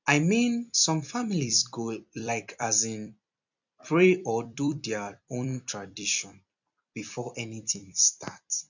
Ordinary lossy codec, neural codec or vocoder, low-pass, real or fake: none; vocoder, 44.1 kHz, 128 mel bands every 256 samples, BigVGAN v2; 7.2 kHz; fake